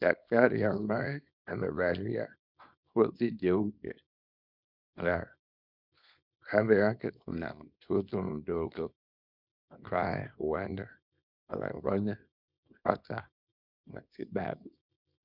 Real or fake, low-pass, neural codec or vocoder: fake; 5.4 kHz; codec, 24 kHz, 0.9 kbps, WavTokenizer, small release